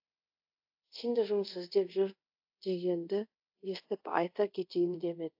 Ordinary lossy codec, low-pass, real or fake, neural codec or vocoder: AAC, 48 kbps; 5.4 kHz; fake; codec, 24 kHz, 0.5 kbps, DualCodec